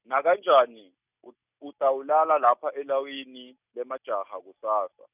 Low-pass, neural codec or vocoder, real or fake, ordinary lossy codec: 3.6 kHz; none; real; none